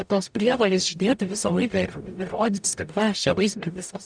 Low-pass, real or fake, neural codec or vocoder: 9.9 kHz; fake; codec, 44.1 kHz, 0.9 kbps, DAC